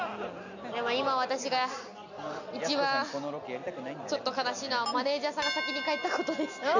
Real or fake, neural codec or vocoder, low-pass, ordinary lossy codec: real; none; 7.2 kHz; none